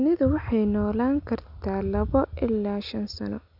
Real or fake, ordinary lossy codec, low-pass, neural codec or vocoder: real; none; 5.4 kHz; none